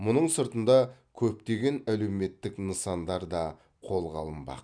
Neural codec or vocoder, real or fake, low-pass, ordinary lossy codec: none; real; 9.9 kHz; none